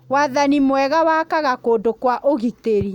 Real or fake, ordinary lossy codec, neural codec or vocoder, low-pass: real; none; none; 19.8 kHz